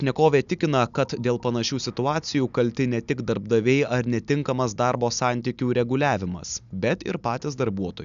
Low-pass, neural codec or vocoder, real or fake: 7.2 kHz; none; real